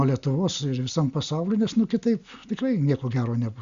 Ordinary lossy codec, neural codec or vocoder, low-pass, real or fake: Opus, 64 kbps; none; 7.2 kHz; real